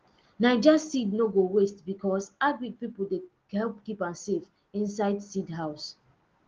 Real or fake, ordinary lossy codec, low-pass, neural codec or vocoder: real; Opus, 16 kbps; 7.2 kHz; none